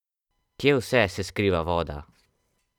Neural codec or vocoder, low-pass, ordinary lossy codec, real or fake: vocoder, 44.1 kHz, 128 mel bands every 256 samples, BigVGAN v2; 19.8 kHz; none; fake